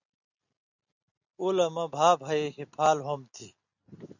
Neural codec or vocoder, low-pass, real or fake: none; 7.2 kHz; real